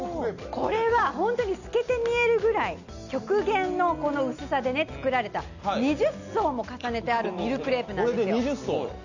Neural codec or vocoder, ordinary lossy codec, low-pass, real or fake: none; none; 7.2 kHz; real